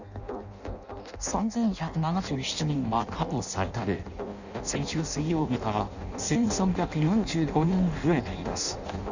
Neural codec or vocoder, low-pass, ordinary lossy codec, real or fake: codec, 16 kHz in and 24 kHz out, 0.6 kbps, FireRedTTS-2 codec; 7.2 kHz; none; fake